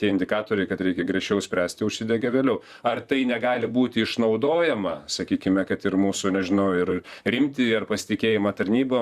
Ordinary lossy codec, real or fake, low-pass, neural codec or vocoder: Opus, 64 kbps; fake; 14.4 kHz; vocoder, 44.1 kHz, 128 mel bands, Pupu-Vocoder